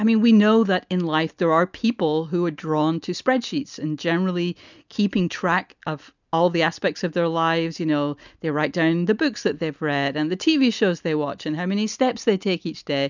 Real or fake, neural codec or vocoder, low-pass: real; none; 7.2 kHz